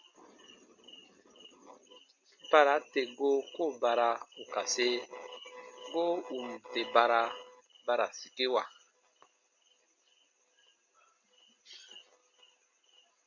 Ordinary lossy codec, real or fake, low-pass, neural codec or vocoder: MP3, 64 kbps; real; 7.2 kHz; none